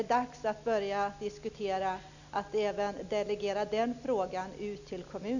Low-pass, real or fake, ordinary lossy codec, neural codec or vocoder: 7.2 kHz; real; none; none